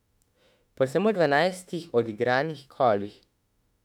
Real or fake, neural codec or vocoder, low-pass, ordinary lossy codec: fake; autoencoder, 48 kHz, 32 numbers a frame, DAC-VAE, trained on Japanese speech; 19.8 kHz; none